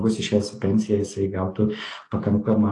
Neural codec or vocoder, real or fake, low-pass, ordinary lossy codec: none; real; 10.8 kHz; AAC, 48 kbps